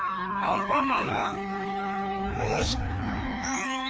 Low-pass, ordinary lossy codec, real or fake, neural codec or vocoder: none; none; fake; codec, 16 kHz, 2 kbps, FreqCodec, larger model